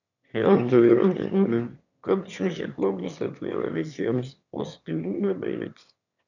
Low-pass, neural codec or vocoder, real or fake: 7.2 kHz; autoencoder, 22.05 kHz, a latent of 192 numbers a frame, VITS, trained on one speaker; fake